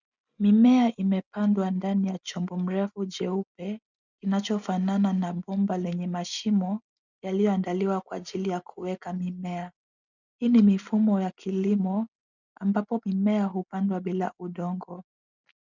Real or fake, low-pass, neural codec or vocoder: real; 7.2 kHz; none